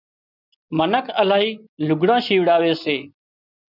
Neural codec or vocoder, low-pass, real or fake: none; 5.4 kHz; real